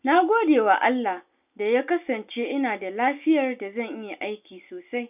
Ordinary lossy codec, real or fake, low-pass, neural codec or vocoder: none; real; 3.6 kHz; none